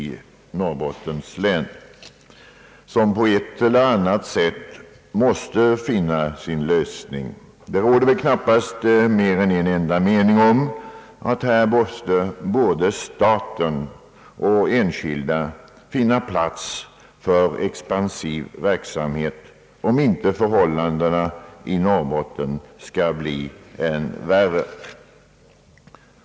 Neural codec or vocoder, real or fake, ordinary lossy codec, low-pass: none; real; none; none